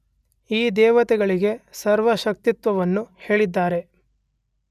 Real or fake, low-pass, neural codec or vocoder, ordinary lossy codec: real; 14.4 kHz; none; none